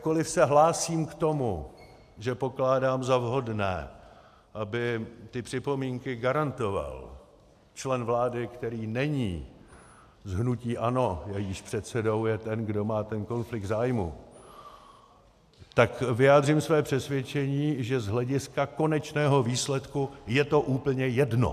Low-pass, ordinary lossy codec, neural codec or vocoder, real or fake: 14.4 kHz; AAC, 96 kbps; none; real